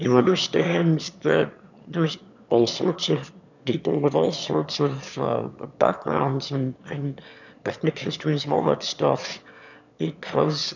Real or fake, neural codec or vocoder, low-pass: fake; autoencoder, 22.05 kHz, a latent of 192 numbers a frame, VITS, trained on one speaker; 7.2 kHz